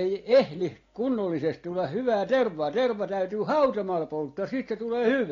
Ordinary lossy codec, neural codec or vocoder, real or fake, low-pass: AAC, 32 kbps; none; real; 7.2 kHz